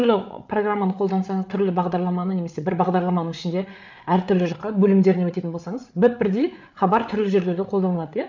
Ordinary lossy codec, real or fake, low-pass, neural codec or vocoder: none; fake; 7.2 kHz; codec, 16 kHz, 8 kbps, FreqCodec, larger model